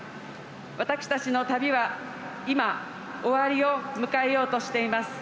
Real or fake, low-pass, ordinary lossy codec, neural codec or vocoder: real; none; none; none